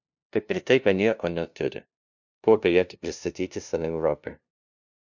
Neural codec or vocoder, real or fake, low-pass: codec, 16 kHz, 0.5 kbps, FunCodec, trained on LibriTTS, 25 frames a second; fake; 7.2 kHz